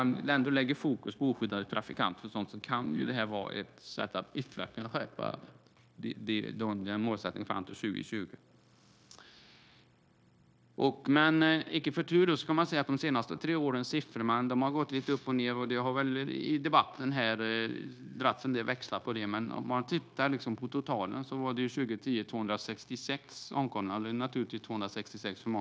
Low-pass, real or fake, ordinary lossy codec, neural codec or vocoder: none; fake; none; codec, 16 kHz, 0.9 kbps, LongCat-Audio-Codec